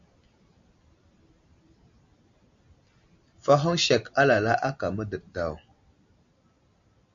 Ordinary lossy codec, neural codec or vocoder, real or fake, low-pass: MP3, 64 kbps; none; real; 7.2 kHz